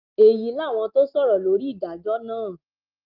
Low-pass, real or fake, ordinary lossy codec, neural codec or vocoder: 5.4 kHz; real; Opus, 32 kbps; none